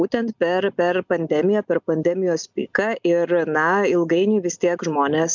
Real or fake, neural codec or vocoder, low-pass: real; none; 7.2 kHz